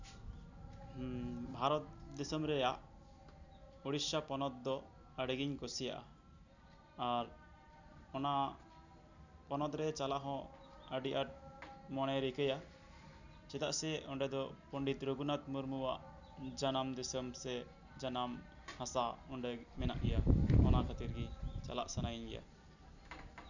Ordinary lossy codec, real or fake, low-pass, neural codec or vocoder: none; real; 7.2 kHz; none